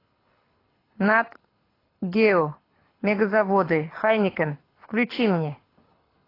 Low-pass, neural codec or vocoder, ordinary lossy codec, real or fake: 5.4 kHz; codec, 24 kHz, 6 kbps, HILCodec; AAC, 24 kbps; fake